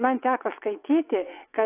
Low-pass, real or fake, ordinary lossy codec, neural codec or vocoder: 3.6 kHz; fake; AAC, 16 kbps; vocoder, 22.05 kHz, 80 mel bands, WaveNeXt